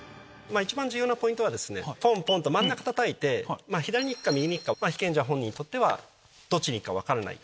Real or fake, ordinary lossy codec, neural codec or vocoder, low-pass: real; none; none; none